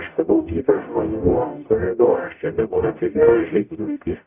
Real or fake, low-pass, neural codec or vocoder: fake; 3.6 kHz; codec, 44.1 kHz, 0.9 kbps, DAC